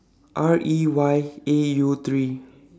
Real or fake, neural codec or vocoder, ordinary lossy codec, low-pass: real; none; none; none